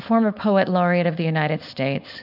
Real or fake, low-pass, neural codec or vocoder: fake; 5.4 kHz; codec, 16 kHz, 4.8 kbps, FACodec